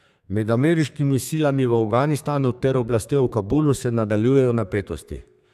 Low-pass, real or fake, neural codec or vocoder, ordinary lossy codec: 14.4 kHz; fake; codec, 32 kHz, 1.9 kbps, SNAC; none